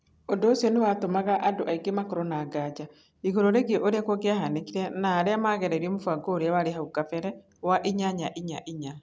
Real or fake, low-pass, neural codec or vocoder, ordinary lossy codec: real; none; none; none